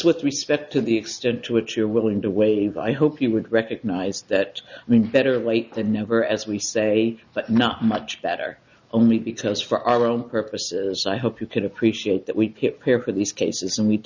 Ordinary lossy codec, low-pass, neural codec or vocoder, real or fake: Opus, 64 kbps; 7.2 kHz; none; real